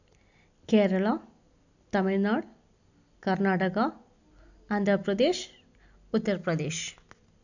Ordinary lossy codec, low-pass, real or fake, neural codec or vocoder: none; 7.2 kHz; real; none